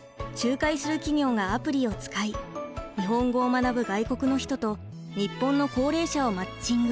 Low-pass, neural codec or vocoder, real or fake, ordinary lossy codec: none; none; real; none